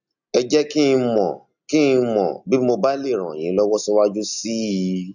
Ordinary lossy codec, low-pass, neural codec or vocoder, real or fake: none; 7.2 kHz; none; real